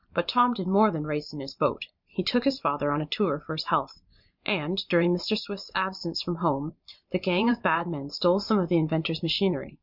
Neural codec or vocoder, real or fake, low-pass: vocoder, 44.1 kHz, 128 mel bands every 512 samples, BigVGAN v2; fake; 5.4 kHz